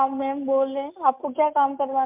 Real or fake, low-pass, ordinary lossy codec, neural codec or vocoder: real; 3.6 kHz; MP3, 32 kbps; none